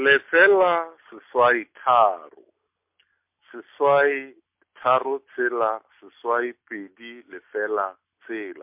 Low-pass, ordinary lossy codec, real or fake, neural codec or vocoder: 3.6 kHz; MP3, 32 kbps; real; none